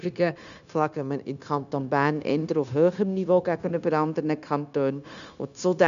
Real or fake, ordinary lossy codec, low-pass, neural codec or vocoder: fake; none; 7.2 kHz; codec, 16 kHz, 0.9 kbps, LongCat-Audio-Codec